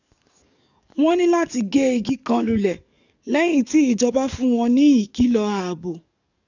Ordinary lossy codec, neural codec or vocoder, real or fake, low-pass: none; codec, 44.1 kHz, 7.8 kbps, DAC; fake; 7.2 kHz